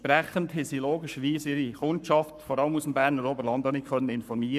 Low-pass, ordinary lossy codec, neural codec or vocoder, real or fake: 14.4 kHz; none; codec, 44.1 kHz, 7.8 kbps, Pupu-Codec; fake